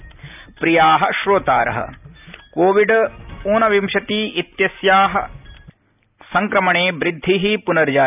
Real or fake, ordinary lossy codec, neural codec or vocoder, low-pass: real; none; none; 3.6 kHz